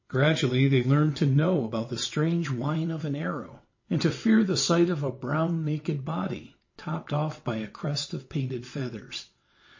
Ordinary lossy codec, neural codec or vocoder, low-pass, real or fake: MP3, 32 kbps; vocoder, 22.05 kHz, 80 mel bands, WaveNeXt; 7.2 kHz; fake